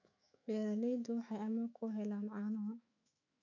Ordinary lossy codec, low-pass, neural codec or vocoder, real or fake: none; 7.2 kHz; autoencoder, 48 kHz, 128 numbers a frame, DAC-VAE, trained on Japanese speech; fake